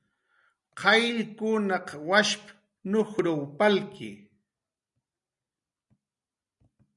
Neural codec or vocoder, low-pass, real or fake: none; 10.8 kHz; real